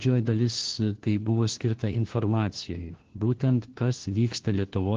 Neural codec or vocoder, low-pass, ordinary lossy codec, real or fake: codec, 16 kHz, 1 kbps, FunCodec, trained on LibriTTS, 50 frames a second; 7.2 kHz; Opus, 16 kbps; fake